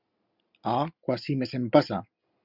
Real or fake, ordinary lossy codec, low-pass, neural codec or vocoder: real; Opus, 64 kbps; 5.4 kHz; none